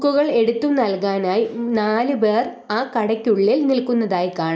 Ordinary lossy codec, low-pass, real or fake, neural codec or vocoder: none; none; real; none